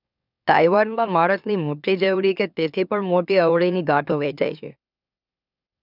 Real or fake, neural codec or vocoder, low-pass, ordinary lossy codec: fake; autoencoder, 44.1 kHz, a latent of 192 numbers a frame, MeloTTS; 5.4 kHz; none